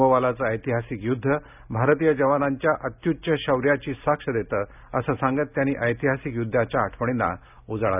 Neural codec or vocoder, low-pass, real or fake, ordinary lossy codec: none; 3.6 kHz; real; none